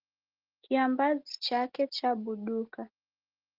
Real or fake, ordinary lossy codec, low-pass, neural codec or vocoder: real; Opus, 16 kbps; 5.4 kHz; none